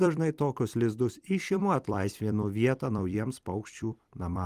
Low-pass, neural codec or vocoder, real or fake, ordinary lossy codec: 14.4 kHz; vocoder, 44.1 kHz, 128 mel bands every 256 samples, BigVGAN v2; fake; Opus, 24 kbps